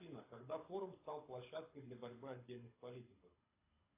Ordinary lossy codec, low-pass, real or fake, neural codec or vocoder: AAC, 24 kbps; 3.6 kHz; fake; codec, 24 kHz, 6 kbps, HILCodec